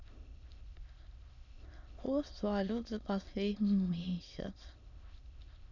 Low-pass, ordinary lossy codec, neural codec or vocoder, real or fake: 7.2 kHz; none; autoencoder, 22.05 kHz, a latent of 192 numbers a frame, VITS, trained on many speakers; fake